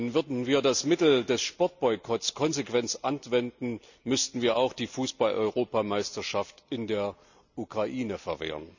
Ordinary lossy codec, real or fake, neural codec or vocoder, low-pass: none; real; none; 7.2 kHz